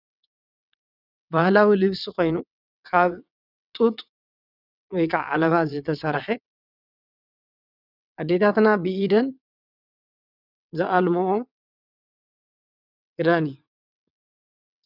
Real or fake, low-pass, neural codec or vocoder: fake; 5.4 kHz; vocoder, 22.05 kHz, 80 mel bands, WaveNeXt